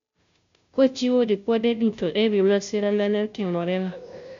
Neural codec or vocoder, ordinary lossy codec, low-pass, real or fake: codec, 16 kHz, 0.5 kbps, FunCodec, trained on Chinese and English, 25 frames a second; MP3, 64 kbps; 7.2 kHz; fake